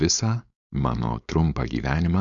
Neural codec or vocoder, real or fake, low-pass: codec, 16 kHz, 4.8 kbps, FACodec; fake; 7.2 kHz